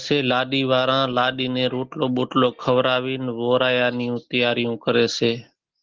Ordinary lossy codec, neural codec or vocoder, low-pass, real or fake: Opus, 16 kbps; none; 7.2 kHz; real